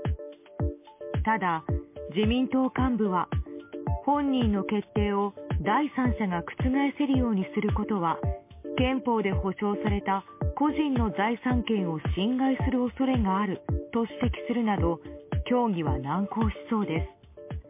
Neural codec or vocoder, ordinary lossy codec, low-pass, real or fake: none; MP3, 24 kbps; 3.6 kHz; real